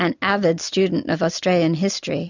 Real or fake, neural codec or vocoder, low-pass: real; none; 7.2 kHz